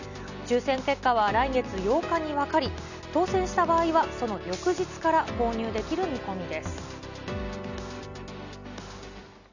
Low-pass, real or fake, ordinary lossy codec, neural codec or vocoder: 7.2 kHz; real; none; none